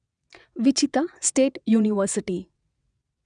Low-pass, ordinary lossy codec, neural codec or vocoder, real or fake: 9.9 kHz; none; vocoder, 22.05 kHz, 80 mel bands, WaveNeXt; fake